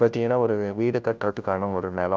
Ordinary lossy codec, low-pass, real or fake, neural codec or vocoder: none; none; fake; codec, 16 kHz, 0.5 kbps, FunCodec, trained on Chinese and English, 25 frames a second